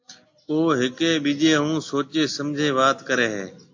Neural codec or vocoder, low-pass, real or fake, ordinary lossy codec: none; 7.2 kHz; real; AAC, 48 kbps